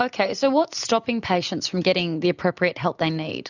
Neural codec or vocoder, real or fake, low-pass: none; real; 7.2 kHz